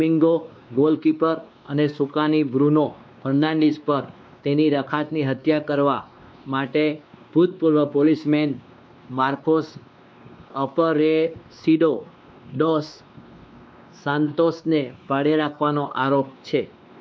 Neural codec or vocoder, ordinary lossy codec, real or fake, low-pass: codec, 16 kHz, 2 kbps, X-Codec, WavLM features, trained on Multilingual LibriSpeech; none; fake; none